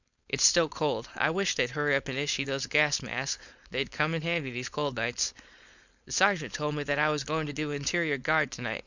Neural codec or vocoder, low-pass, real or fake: codec, 16 kHz, 4.8 kbps, FACodec; 7.2 kHz; fake